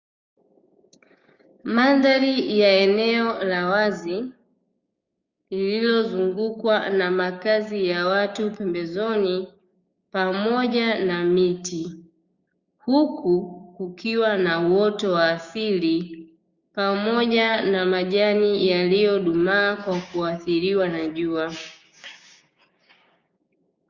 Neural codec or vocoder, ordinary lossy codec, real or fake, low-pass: codec, 16 kHz, 6 kbps, DAC; Opus, 64 kbps; fake; 7.2 kHz